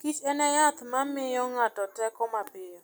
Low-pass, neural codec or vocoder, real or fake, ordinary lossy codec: none; none; real; none